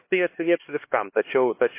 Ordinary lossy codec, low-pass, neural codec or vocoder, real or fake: MP3, 24 kbps; 3.6 kHz; codec, 16 kHz, 2 kbps, X-Codec, HuBERT features, trained on LibriSpeech; fake